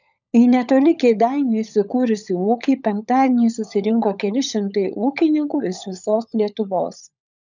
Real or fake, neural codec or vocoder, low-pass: fake; codec, 16 kHz, 16 kbps, FunCodec, trained on LibriTTS, 50 frames a second; 7.2 kHz